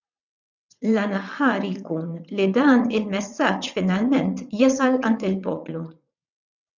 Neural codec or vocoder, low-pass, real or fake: vocoder, 22.05 kHz, 80 mel bands, WaveNeXt; 7.2 kHz; fake